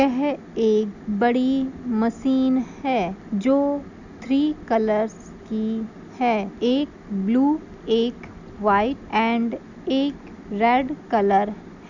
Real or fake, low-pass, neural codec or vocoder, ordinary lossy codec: real; 7.2 kHz; none; none